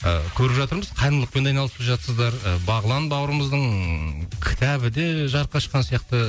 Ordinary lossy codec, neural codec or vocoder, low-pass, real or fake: none; none; none; real